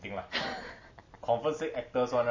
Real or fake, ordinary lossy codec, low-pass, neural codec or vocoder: real; MP3, 32 kbps; 7.2 kHz; none